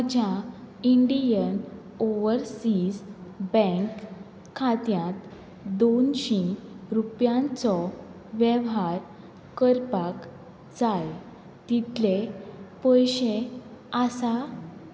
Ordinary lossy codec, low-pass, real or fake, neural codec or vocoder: none; none; real; none